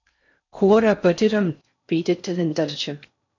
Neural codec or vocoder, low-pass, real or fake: codec, 16 kHz in and 24 kHz out, 0.6 kbps, FocalCodec, streaming, 4096 codes; 7.2 kHz; fake